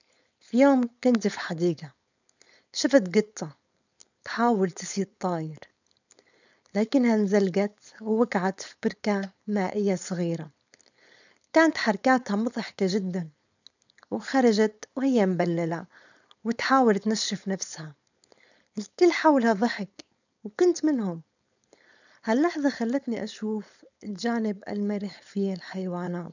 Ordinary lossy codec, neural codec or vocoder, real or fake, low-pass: none; codec, 16 kHz, 4.8 kbps, FACodec; fake; 7.2 kHz